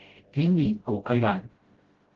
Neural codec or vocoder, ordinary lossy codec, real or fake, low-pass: codec, 16 kHz, 0.5 kbps, FreqCodec, smaller model; Opus, 16 kbps; fake; 7.2 kHz